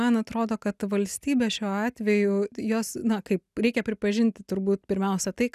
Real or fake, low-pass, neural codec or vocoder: real; 14.4 kHz; none